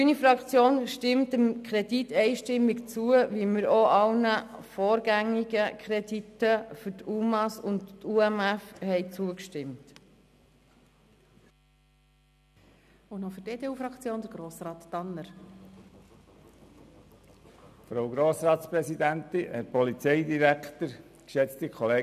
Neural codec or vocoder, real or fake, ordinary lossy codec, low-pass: none; real; none; 14.4 kHz